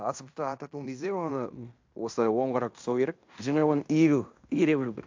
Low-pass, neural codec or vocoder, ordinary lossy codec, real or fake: 7.2 kHz; codec, 16 kHz in and 24 kHz out, 0.9 kbps, LongCat-Audio-Codec, fine tuned four codebook decoder; MP3, 64 kbps; fake